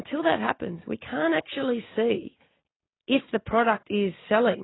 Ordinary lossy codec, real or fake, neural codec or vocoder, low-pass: AAC, 16 kbps; real; none; 7.2 kHz